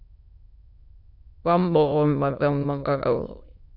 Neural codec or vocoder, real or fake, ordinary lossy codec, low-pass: autoencoder, 22.05 kHz, a latent of 192 numbers a frame, VITS, trained on many speakers; fake; AAC, 48 kbps; 5.4 kHz